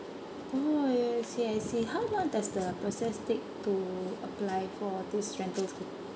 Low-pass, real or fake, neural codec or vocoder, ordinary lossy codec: none; real; none; none